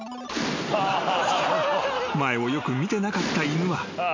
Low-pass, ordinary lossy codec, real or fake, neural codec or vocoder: 7.2 kHz; MP3, 48 kbps; real; none